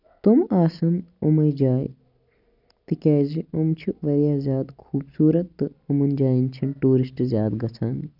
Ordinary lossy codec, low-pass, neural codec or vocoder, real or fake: none; 5.4 kHz; none; real